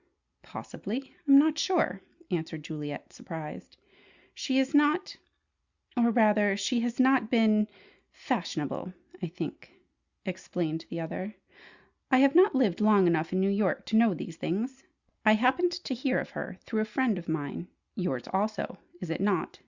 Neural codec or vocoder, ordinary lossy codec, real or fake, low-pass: none; Opus, 64 kbps; real; 7.2 kHz